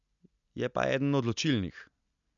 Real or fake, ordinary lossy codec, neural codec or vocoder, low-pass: real; none; none; 7.2 kHz